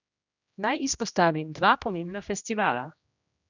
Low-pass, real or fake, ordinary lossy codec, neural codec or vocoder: 7.2 kHz; fake; none; codec, 16 kHz, 1 kbps, X-Codec, HuBERT features, trained on general audio